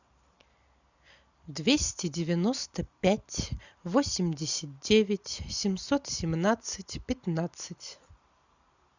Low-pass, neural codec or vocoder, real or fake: 7.2 kHz; none; real